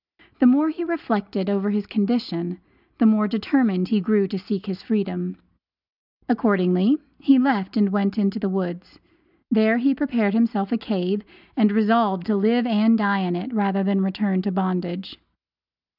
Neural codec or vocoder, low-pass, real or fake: none; 5.4 kHz; real